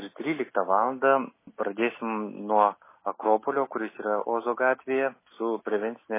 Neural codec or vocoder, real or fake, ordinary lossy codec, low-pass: none; real; MP3, 16 kbps; 3.6 kHz